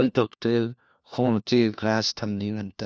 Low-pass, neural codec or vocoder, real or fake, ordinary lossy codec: none; codec, 16 kHz, 1 kbps, FunCodec, trained on LibriTTS, 50 frames a second; fake; none